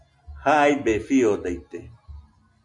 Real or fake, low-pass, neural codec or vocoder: real; 10.8 kHz; none